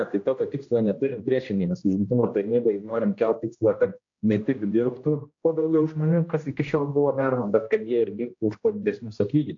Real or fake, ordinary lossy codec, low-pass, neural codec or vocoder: fake; AAC, 48 kbps; 7.2 kHz; codec, 16 kHz, 1 kbps, X-Codec, HuBERT features, trained on general audio